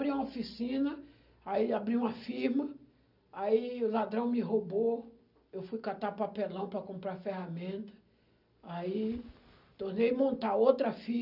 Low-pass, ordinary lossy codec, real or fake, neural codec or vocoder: 5.4 kHz; none; real; none